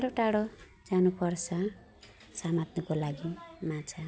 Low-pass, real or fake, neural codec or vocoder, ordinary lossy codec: none; real; none; none